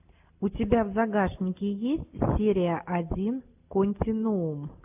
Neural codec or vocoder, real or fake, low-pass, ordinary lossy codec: none; real; 3.6 kHz; AAC, 32 kbps